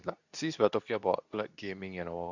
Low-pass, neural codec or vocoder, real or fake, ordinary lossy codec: 7.2 kHz; codec, 24 kHz, 0.9 kbps, WavTokenizer, medium speech release version 2; fake; none